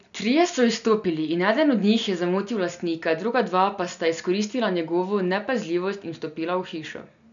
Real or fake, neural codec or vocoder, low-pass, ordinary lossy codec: real; none; 7.2 kHz; none